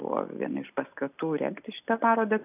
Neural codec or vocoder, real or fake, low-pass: none; real; 3.6 kHz